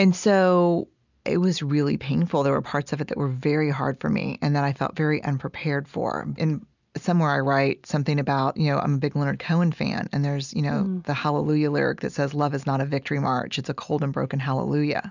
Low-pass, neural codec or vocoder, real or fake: 7.2 kHz; none; real